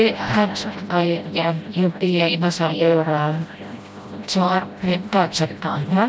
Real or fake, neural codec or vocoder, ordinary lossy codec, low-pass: fake; codec, 16 kHz, 0.5 kbps, FreqCodec, smaller model; none; none